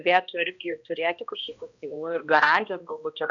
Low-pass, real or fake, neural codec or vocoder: 7.2 kHz; fake; codec, 16 kHz, 1 kbps, X-Codec, HuBERT features, trained on balanced general audio